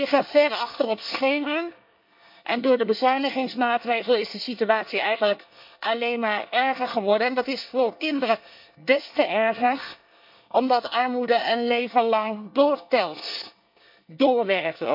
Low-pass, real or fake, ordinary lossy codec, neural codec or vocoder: 5.4 kHz; fake; MP3, 48 kbps; codec, 24 kHz, 1 kbps, SNAC